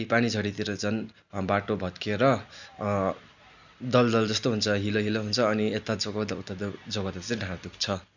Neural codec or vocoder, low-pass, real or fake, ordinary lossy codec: none; 7.2 kHz; real; none